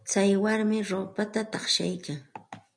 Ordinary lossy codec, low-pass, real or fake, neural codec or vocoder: MP3, 96 kbps; 9.9 kHz; real; none